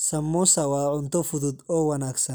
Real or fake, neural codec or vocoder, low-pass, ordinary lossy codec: real; none; none; none